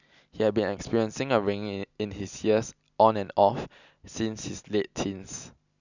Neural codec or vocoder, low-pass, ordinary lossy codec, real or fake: none; 7.2 kHz; none; real